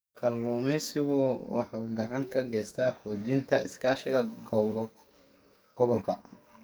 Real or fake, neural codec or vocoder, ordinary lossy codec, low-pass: fake; codec, 44.1 kHz, 2.6 kbps, SNAC; none; none